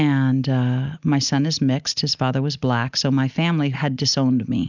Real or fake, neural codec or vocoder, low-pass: real; none; 7.2 kHz